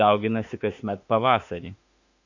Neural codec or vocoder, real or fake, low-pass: autoencoder, 48 kHz, 32 numbers a frame, DAC-VAE, trained on Japanese speech; fake; 7.2 kHz